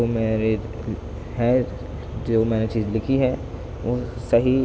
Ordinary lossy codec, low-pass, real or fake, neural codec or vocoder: none; none; real; none